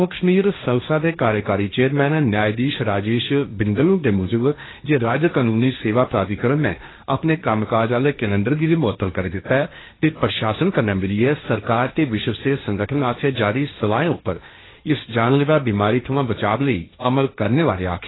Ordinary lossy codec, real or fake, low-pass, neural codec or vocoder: AAC, 16 kbps; fake; 7.2 kHz; codec, 16 kHz, 1.1 kbps, Voila-Tokenizer